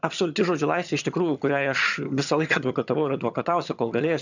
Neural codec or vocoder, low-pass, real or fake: vocoder, 22.05 kHz, 80 mel bands, HiFi-GAN; 7.2 kHz; fake